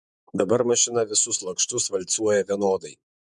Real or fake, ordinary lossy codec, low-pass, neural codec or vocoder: fake; MP3, 96 kbps; 10.8 kHz; vocoder, 48 kHz, 128 mel bands, Vocos